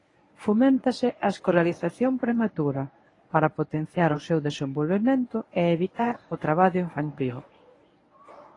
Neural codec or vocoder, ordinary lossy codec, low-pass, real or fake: codec, 24 kHz, 0.9 kbps, WavTokenizer, medium speech release version 1; AAC, 32 kbps; 10.8 kHz; fake